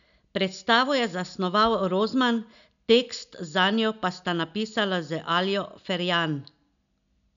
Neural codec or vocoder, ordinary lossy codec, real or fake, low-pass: none; none; real; 7.2 kHz